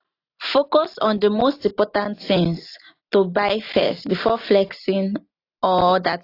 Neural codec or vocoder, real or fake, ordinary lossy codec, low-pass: none; real; AAC, 32 kbps; 5.4 kHz